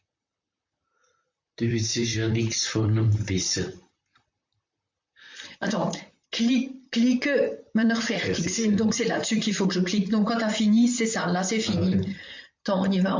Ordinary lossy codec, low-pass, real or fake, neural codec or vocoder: MP3, 48 kbps; 7.2 kHz; fake; vocoder, 44.1 kHz, 128 mel bands, Pupu-Vocoder